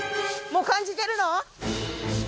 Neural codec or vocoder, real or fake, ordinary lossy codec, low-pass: none; real; none; none